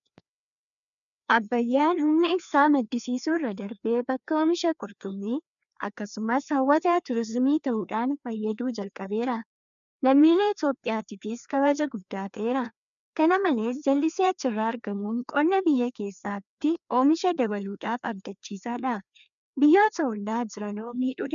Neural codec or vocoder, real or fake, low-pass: codec, 16 kHz, 2 kbps, FreqCodec, larger model; fake; 7.2 kHz